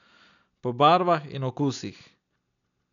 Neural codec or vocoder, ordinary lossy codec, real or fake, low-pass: none; none; real; 7.2 kHz